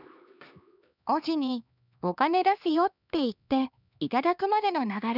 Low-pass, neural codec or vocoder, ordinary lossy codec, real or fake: 5.4 kHz; codec, 16 kHz, 2 kbps, X-Codec, HuBERT features, trained on LibriSpeech; none; fake